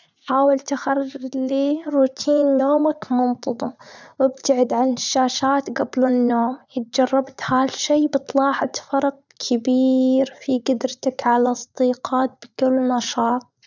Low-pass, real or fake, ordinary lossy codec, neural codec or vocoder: 7.2 kHz; fake; none; vocoder, 44.1 kHz, 80 mel bands, Vocos